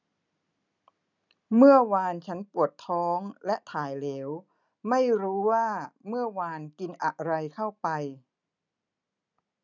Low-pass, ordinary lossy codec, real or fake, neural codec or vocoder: 7.2 kHz; none; real; none